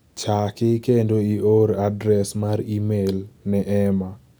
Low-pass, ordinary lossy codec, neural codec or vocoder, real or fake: none; none; none; real